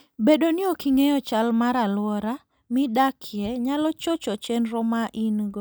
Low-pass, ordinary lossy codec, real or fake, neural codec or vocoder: none; none; real; none